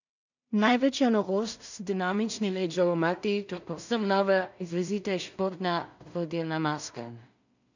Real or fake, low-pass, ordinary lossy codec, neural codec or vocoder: fake; 7.2 kHz; none; codec, 16 kHz in and 24 kHz out, 0.4 kbps, LongCat-Audio-Codec, two codebook decoder